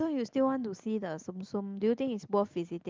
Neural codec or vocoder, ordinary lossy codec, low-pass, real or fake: none; Opus, 24 kbps; 7.2 kHz; real